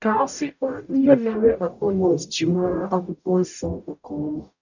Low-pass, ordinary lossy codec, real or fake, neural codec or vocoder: 7.2 kHz; none; fake; codec, 44.1 kHz, 0.9 kbps, DAC